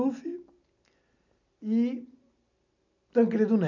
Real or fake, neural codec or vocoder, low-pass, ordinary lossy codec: real; none; 7.2 kHz; none